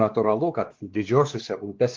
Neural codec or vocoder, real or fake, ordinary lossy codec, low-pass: codec, 16 kHz, 2 kbps, X-Codec, WavLM features, trained on Multilingual LibriSpeech; fake; Opus, 16 kbps; 7.2 kHz